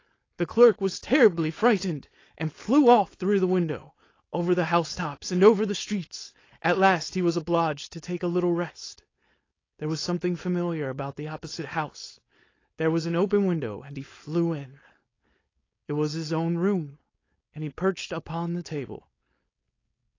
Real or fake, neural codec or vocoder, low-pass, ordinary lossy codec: fake; codec, 16 kHz, 4.8 kbps, FACodec; 7.2 kHz; AAC, 32 kbps